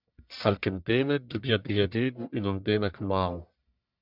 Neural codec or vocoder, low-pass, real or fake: codec, 44.1 kHz, 1.7 kbps, Pupu-Codec; 5.4 kHz; fake